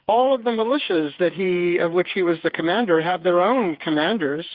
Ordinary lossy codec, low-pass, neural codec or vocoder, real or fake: Opus, 64 kbps; 5.4 kHz; codec, 16 kHz, 4 kbps, FreqCodec, smaller model; fake